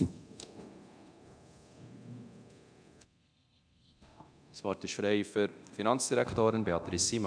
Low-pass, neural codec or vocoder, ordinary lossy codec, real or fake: 9.9 kHz; codec, 24 kHz, 0.9 kbps, DualCodec; none; fake